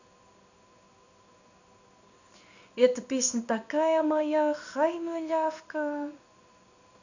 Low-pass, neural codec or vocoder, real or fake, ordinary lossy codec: 7.2 kHz; codec, 16 kHz in and 24 kHz out, 1 kbps, XY-Tokenizer; fake; none